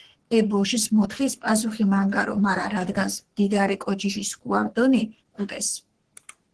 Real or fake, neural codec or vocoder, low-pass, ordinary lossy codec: fake; codec, 44.1 kHz, 3.4 kbps, Pupu-Codec; 10.8 kHz; Opus, 16 kbps